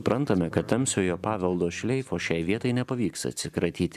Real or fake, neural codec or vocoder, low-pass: fake; vocoder, 44.1 kHz, 128 mel bands every 512 samples, BigVGAN v2; 14.4 kHz